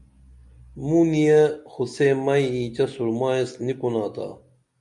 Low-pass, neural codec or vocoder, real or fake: 10.8 kHz; none; real